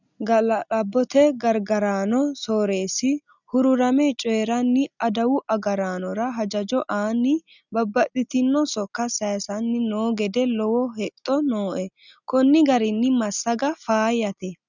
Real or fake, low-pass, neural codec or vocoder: real; 7.2 kHz; none